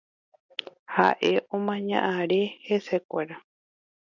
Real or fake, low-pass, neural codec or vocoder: real; 7.2 kHz; none